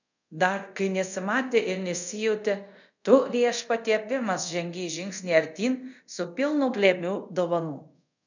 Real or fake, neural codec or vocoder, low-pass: fake; codec, 24 kHz, 0.5 kbps, DualCodec; 7.2 kHz